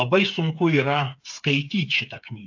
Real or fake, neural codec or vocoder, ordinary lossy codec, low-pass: fake; codec, 16 kHz, 8 kbps, FreqCodec, smaller model; AAC, 48 kbps; 7.2 kHz